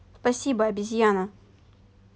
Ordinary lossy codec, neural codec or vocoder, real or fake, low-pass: none; none; real; none